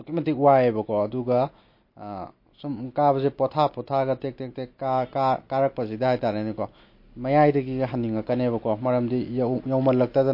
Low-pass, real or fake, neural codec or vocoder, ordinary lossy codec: 5.4 kHz; real; none; MP3, 32 kbps